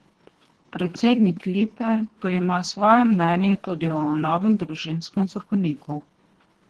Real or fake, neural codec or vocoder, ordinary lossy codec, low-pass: fake; codec, 24 kHz, 1.5 kbps, HILCodec; Opus, 16 kbps; 10.8 kHz